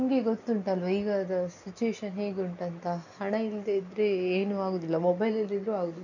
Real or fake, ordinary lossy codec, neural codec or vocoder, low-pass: real; none; none; 7.2 kHz